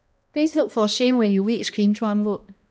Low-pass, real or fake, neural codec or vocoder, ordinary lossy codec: none; fake; codec, 16 kHz, 1 kbps, X-Codec, HuBERT features, trained on balanced general audio; none